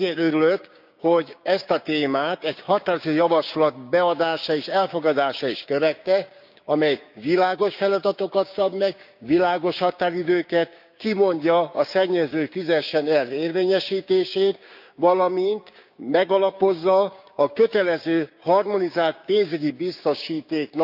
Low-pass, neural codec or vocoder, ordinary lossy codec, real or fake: 5.4 kHz; codec, 16 kHz, 6 kbps, DAC; none; fake